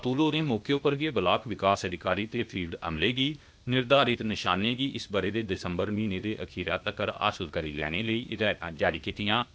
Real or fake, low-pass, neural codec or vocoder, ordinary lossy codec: fake; none; codec, 16 kHz, 0.8 kbps, ZipCodec; none